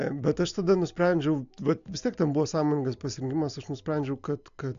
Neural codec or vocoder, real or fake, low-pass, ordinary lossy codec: none; real; 7.2 kHz; AAC, 96 kbps